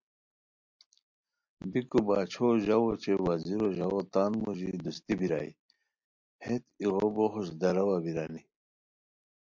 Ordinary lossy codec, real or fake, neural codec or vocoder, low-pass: MP3, 64 kbps; real; none; 7.2 kHz